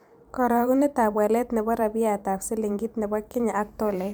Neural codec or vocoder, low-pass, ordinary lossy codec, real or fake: vocoder, 44.1 kHz, 128 mel bands every 512 samples, BigVGAN v2; none; none; fake